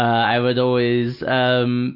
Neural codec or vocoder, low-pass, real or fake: none; 5.4 kHz; real